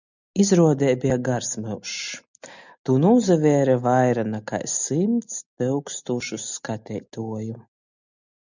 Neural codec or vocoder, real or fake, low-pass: none; real; 7.2 kHz